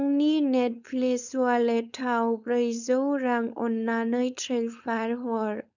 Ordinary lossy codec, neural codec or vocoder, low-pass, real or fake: none; codec, 16 kHz, 4.8 kbps, FACodec; 7.2 kHz; fake